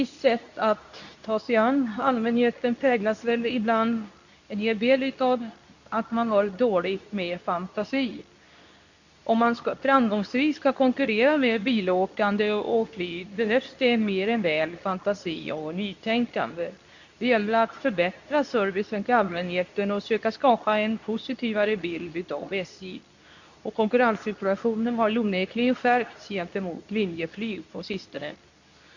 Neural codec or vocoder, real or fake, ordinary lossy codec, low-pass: codec, 24 kHz, 0.9 kbps, WavTokenizer, medium speech release version 2; fake; none; 7.2 kHz